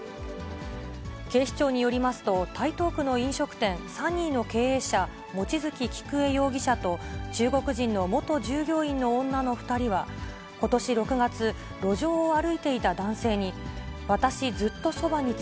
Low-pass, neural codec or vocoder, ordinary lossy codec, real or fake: none; none; none; real